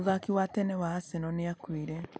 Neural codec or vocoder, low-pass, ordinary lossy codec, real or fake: none; none; none; real